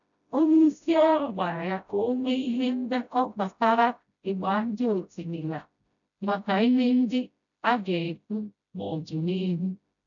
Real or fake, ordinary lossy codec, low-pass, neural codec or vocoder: fake; none; 7.2 kHz; codec, 16 kHz, 0.5 kbps, FreqCodec, smaller model